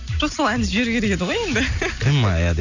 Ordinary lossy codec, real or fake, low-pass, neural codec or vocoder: none; real; 7.2 kHz; none